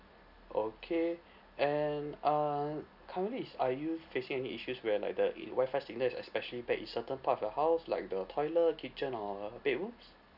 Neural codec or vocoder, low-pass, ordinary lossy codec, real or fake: none; 5.4 kHz; none; real